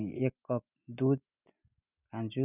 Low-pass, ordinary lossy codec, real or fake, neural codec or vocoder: 3.6 kHz; none; real; none